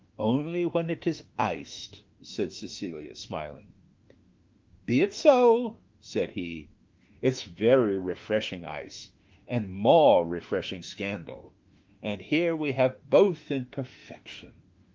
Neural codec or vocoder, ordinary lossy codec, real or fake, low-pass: autoencoder, 48 kHz, 32 numbers a frame, DAC-VAE, trained on Japanese speech; Opus, 32 kbps; fake; 7.2 kHz